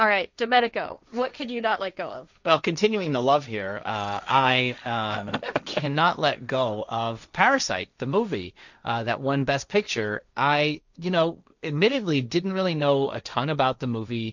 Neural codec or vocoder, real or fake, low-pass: codec, 16 kHz, 1.1 kbps, Voila-Tokenizer; fake; 7.2 kHz